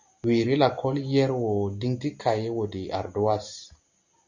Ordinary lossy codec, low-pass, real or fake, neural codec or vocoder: Opus, 64 kbps; 7.2 kHz; real; none